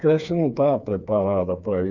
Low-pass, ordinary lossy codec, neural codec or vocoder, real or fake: 7.2 kHz; none; codec, 16 kHz, 4 kbps, FreqCodec, smaller model; fake